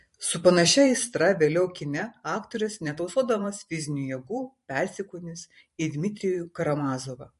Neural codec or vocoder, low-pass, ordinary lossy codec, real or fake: vocoder, 44.1 kHz, 128 mel bands every 512 samples, BigVGAN v2; 14.4 kHz; MP3, 48 kbps; fake